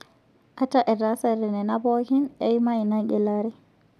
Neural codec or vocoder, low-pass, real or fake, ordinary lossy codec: none; 14.4 kHz; real; none